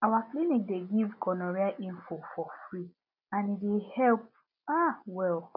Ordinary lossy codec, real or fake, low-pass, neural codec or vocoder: none; real; 5.4 kHz; none